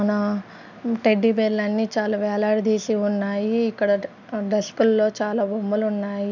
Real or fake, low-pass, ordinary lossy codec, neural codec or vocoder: real; 7.2 kHz; none; none